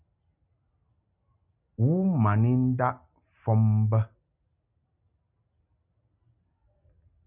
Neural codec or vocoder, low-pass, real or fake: none; 3.6 kHz; real